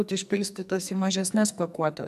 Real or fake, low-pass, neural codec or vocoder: fake; 14.4 kHz; codec, 44.1 kHz, 2.6 kbps, SNAC